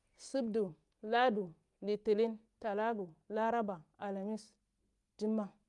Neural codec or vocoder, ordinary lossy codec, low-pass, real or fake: none; Opus, 32 kbps; 10.8 kHz; real